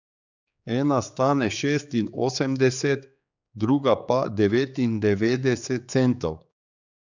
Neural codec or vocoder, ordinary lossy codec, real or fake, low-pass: codec, 16 kHz, 4 kbps, X-Codec, HuBERT features, trained on general audio; none; fake; 7.2 kHz